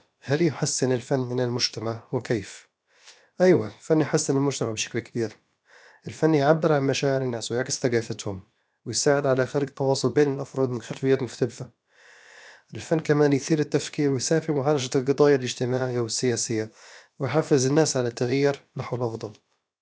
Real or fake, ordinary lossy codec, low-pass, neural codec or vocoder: fake; none; none; codec, 16 kHz, about 1 kbps, DyCAST, with the encoder's durations